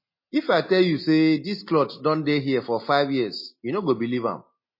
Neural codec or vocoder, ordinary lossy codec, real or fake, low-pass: none; MP3, 24 kbps; real; 5.4 kHz